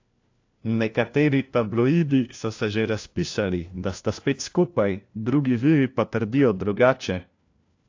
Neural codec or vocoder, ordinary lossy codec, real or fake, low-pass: codec, 16 kHz, 1 kbps, FunCodec, trained on LibriTTS, 50 frames a second; AAC, 48 kbps; fake; 7.2 kHz